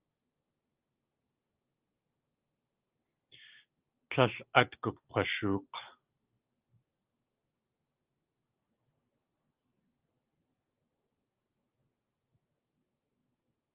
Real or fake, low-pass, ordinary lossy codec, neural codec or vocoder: real; 3.6 kHz; Opus, 24 kbps; none